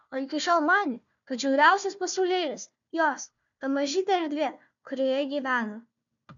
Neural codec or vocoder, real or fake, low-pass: codec, 16 kHz, 1 kbps, FunCodec, trained on Chinese and English, 50 frames a second; fake; 7.2 kHz